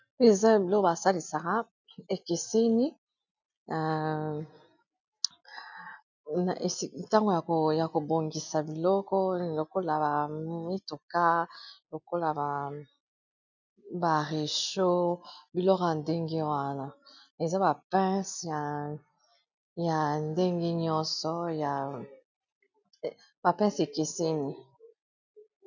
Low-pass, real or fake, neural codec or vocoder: 7.2 kHz; real; none